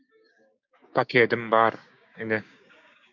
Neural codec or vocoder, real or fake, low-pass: codec, 16 kHz, 6 kbps, DAC; fake; 7.2 kHz